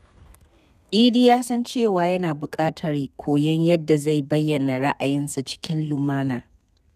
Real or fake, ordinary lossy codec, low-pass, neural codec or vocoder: fake; none; 14.4 kHz; codec, 32 kHz, 1.9 kbps, SNAC